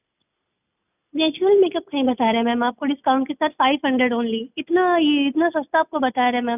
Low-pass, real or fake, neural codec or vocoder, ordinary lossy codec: 3.6 kHz; real; none; none